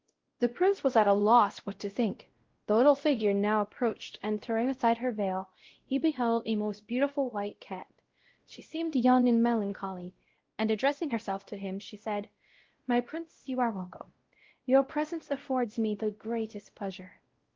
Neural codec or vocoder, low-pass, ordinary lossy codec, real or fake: codec, 16 kHz, 0.5 kbps, X-Codec, WavLM features, trained on Multilingual LibriSpeech; 7.2 kHz; Opus, 16 kbps; fake